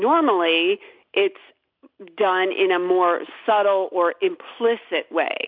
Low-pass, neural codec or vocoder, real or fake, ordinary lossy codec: 5.4 kHz; none; real; MP3, 48 kbps